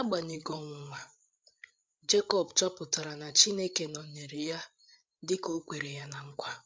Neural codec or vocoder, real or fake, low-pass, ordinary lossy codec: codec, 16 kHz, 8 kbps, FreqCodec, larger model; fake; none; none